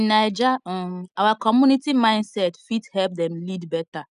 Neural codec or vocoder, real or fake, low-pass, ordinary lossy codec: none; real; 10.8 kHz; none